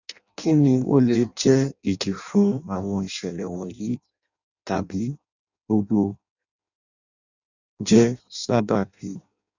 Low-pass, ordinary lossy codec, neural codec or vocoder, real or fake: 7.2 kHz; none; codec, 16 kHz in and 24 kHz out, 0.6 kbps, FireRedTTS-2 codec; fake